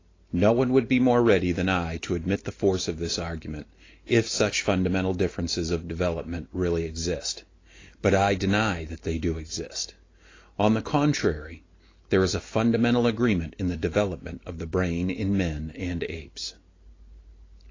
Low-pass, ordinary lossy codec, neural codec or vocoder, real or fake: 7.2 kHz; AAC, 32 kbps; none; real